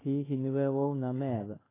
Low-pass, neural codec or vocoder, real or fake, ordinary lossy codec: 3.6 kHz; codec, 16 kHz in and 24 kHz out, 1 kbps, XY-Tokenizer; fake; AAC, 16 kbps